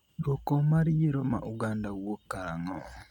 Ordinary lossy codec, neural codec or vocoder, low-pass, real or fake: none; none; 19.8 kHz; real